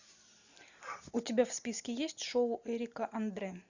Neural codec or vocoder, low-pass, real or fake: none; 7.2 kHz; real